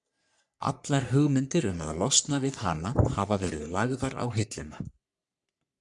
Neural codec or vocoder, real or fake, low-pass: codec, 44.1 kHz, 3.4 kbps, Pupu-Codec; fake; 10.8 kHz